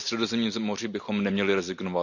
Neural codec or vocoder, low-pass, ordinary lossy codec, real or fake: none; 7.2 kHz; none; real